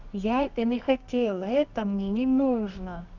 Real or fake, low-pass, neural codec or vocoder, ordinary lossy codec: fake; 7.2 kHz; codec, 24 kHz, 0.9 kbps, WavTokenizer, medium music audio release; none